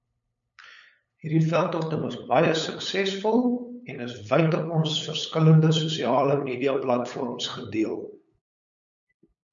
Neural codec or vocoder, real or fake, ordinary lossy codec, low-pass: codec, 16 kHz, 8 kbps, FunCodec, trained on LibriTTS, 25 frames a second; fake; MP3, 64 kbps; 7.2 kHz